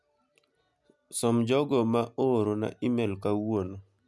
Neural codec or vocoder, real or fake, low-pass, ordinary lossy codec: none; real; none; none